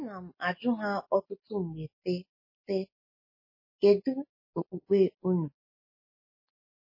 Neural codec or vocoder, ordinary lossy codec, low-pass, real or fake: none; MP3, 24 kbps; 5.4 kHz; real